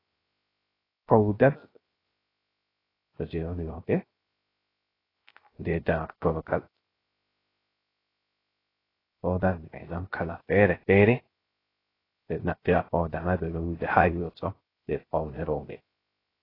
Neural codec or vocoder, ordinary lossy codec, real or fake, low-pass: codec, 16 kHz, 0.3 kbps, FocalCodec; AAC, 24 kbps; fake; 5.4 kHz